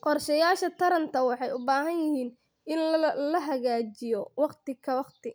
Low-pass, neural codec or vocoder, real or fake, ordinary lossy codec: none; none; real; none